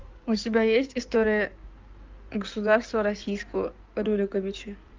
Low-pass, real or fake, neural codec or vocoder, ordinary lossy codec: 7.2 kHz; fake; codec, 16 kHz in and 24 kHz out, 2.2 kbps, FireRedTTS-2 codec; Opus, 24 kbps